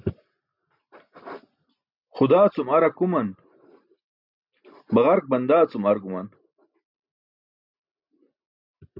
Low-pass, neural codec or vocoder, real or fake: 5.4 kHz; none; real